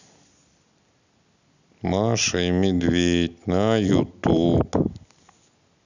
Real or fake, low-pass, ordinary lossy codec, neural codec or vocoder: real; 7.2 kHz; none; none